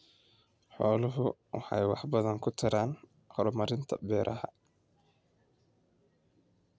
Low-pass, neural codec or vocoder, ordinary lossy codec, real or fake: none; none; none; real